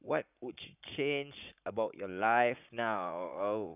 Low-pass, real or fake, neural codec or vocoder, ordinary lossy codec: 3.6 kHz; fake; codec, 44.1 kHz, 7.8 kbps, Pupu-Codec; none